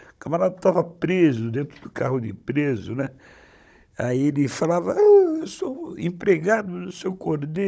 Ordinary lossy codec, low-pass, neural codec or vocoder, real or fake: none; none; codec, 16 kHz, 16 kbps, FunCodec, trained on Chinese and English, 50 frames a second; fake